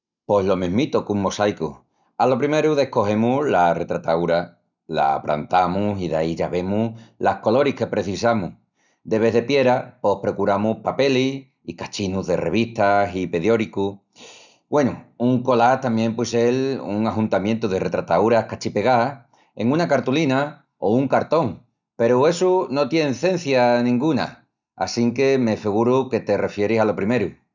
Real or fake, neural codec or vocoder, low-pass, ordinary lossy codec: real; none; 7.2 kHz; none